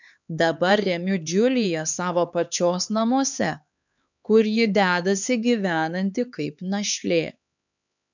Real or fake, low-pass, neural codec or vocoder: fake; 7.2 kHz; codec, 16 kHz, 4 kbps, X-Codec, HuBERT features, trained on LibriSpeech